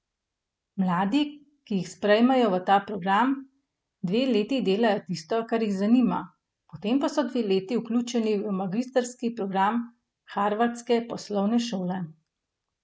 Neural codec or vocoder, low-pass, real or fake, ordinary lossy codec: none; none; real; none